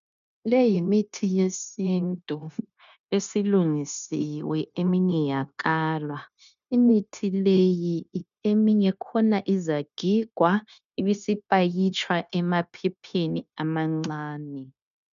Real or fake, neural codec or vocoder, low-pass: fake; codec, 16 kHz, 0.9 kbps, LongCat-Audio-Codec; 7.2 kHz